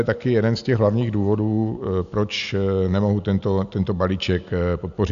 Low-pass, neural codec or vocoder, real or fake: 7.2 kHz; none; real